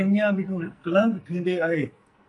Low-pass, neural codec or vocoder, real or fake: 10.8 kHz; codec, 44.1 kHz, 2.6 kbps, SNAC; fake